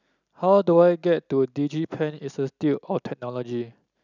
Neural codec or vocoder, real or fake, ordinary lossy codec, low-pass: none; real; none; 7.2 kHz